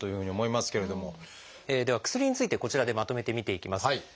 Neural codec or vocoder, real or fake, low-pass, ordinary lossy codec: none; real; none; none